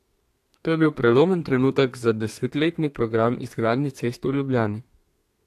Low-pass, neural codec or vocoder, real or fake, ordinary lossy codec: 14.4 kHz; codec, 32 kHz, 1.9 kbps, SNAC; fake; AAC, 64 kbps